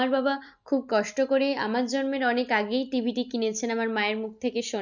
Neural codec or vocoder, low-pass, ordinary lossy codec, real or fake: none; 7.2 kHz; none; real